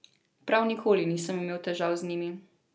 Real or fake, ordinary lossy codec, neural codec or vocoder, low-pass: real; none; none; none